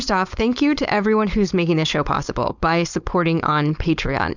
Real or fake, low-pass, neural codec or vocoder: fake; 7.2 kHz; codec, 16 kHz, 4.8 kbps, FACodec